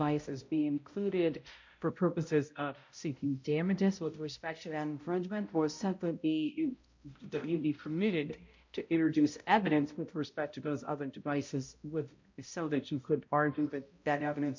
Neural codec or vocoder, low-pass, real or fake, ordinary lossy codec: codec, 16 kHz, 0.5 kbps, X-Codec, HuBERT features, trained on balanced general audio; 7.2 kHz; fake; MP3, 48 kbps